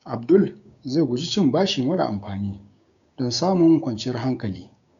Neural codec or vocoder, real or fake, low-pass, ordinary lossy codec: codec, 16 kHz, 6 kbps, DAC; fake; 7.2 kHz; none